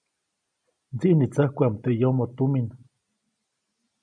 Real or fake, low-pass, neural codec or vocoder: real; 9.9 kHz; none